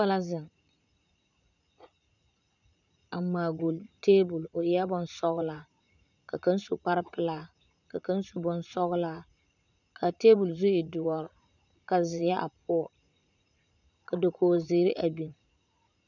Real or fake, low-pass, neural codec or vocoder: fake; 7.2 kHz; vocoder, 44.1 kHz, 80 mel bands, Vocos